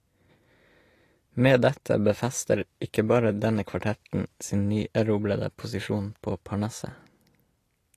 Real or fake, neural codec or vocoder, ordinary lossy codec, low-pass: real; none; AAC, 48 kbps; 14.4 kHz